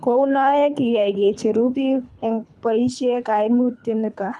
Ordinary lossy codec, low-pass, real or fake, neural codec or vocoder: none; none; fake; codec, 24 kHz, 3 kbps, HILCodec